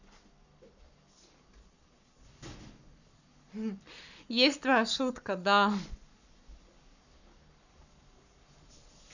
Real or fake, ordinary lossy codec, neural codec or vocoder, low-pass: fake; none; codec, 44.1 kHz, 7.8 kbps, Pupu-Codec; 7.2 kHz